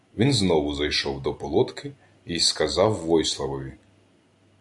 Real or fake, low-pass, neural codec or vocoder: real; 10.8 kHz; none